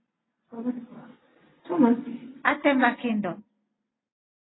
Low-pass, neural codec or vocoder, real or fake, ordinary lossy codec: 7.2 kHz; none; real; AAC, 16 kbps